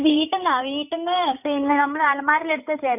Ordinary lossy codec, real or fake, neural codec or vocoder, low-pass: none; fake; codec, 16 kHz, 8 kbps, FreqCodec, larger model; 3.6 kHz